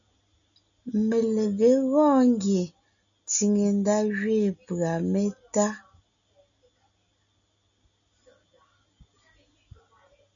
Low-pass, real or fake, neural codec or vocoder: 7.2 kHz; real; none